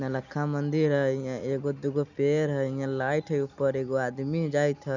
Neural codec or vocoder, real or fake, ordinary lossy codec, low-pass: none; real; none; 7.2 kHz